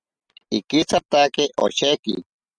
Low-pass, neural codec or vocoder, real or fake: 9.9 kHz; none; real